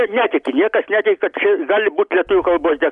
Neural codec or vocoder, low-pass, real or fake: none; 10.8 kHz; real